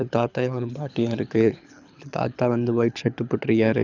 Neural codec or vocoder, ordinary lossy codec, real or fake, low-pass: codec, 24 kHz, 6 kbps, HILCodec; none; fake; 7.2 kHz